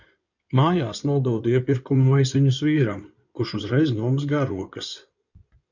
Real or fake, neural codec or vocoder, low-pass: fake; codec, 16 kHz in and 24 kHz out, 2.2 kbps, FireRedTTS-2 codec; 7.2 kHz